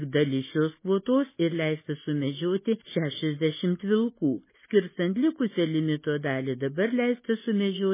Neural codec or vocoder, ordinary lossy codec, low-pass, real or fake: none; MP3, 16 kbps; 3.6 kHz; real